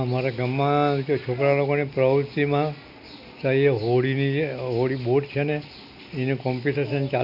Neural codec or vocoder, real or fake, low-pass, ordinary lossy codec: none; real; 5.4 kHz; none